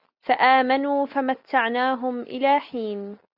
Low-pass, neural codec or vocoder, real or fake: 5.4 kHz; none; real